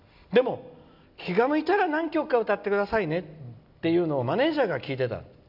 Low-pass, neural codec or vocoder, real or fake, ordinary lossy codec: 5.4 kHz; none; real; none